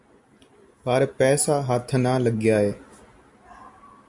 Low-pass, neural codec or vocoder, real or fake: 10.8 kHz; none; real